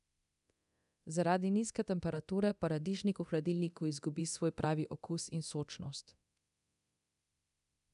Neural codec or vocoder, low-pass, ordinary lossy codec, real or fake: codec, 24 kHz, 0.9 kbps, DualCodec; 10.8 kHz; none; fake